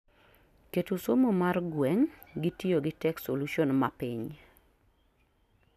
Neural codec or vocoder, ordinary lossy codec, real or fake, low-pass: none; none; real; 14.4 kHz